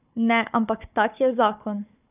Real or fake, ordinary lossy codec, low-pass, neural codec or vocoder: fake; none; 3.6 kHz; codec, 16 kHz, 4 kbps, FunCodec, trained on Chinese and English, 50 frames a second